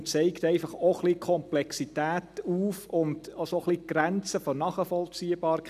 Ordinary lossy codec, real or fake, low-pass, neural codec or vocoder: none; real; 14.4 kHz; none